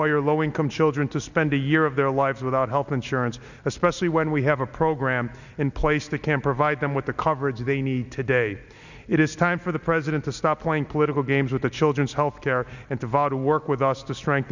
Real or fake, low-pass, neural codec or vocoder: real; 7.2 kHz; none